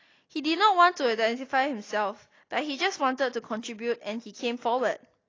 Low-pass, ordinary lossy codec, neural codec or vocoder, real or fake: 7.2 kHz; AAC, 32 kbps; none; real